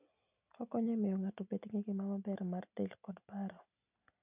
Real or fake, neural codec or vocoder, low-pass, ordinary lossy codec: real; none; 3.6 kHz; none